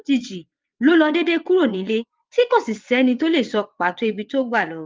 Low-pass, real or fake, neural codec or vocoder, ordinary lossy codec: 7.2 kHz; fake; vocoder, 22.05 kHz, 80 mel bands, WaveNeXt; Opus, 24 kbps